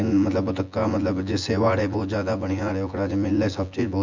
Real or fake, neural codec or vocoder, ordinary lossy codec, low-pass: fake; vocoder, 24 kHz, 100 mel bands, Vocos; MP3, 64 kbps; 7.2 kHz